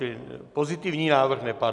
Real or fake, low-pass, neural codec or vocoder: real; 10.8 kHz; none